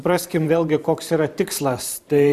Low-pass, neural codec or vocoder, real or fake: 14.4 kHz; vocoder, 44.1 kHz, 128 mel bands every 512 samples, BigVGAN v2; fake